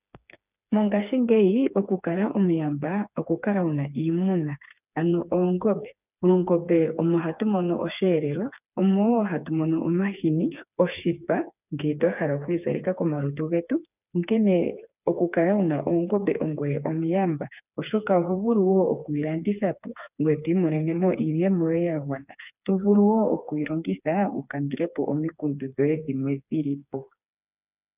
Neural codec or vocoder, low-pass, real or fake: codec, 16 kHz, 4 kbps, FreqCodec, smaller model; 3.6 kHz; fake